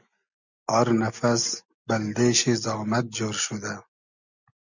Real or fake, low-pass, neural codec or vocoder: real; 7.2 kHz; none